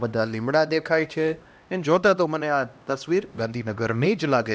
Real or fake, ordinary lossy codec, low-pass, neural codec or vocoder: fake; none; none; codec, 16 kHz, 1 kbps, X-Codec, HuBERT features, trained on LibriSpeech